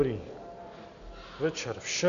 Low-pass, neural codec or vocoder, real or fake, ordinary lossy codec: 7.2 kHz; none; real; AAC, 96 kbps